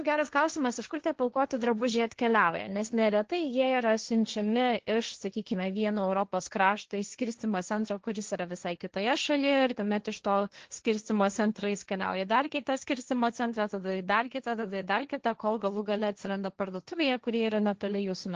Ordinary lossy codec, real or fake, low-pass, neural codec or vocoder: Opus, 16 kbps; fake; 7.2 kHz; codec, 16 kHz, 1.1 kbps, Voila-Tokenizer